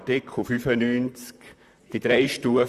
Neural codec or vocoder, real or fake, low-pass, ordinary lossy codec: vocoder, 44.1 kHz, 128 mel bands, Pupu-Vocoder; fake; 14.4 kHz; Opus, 64 kbps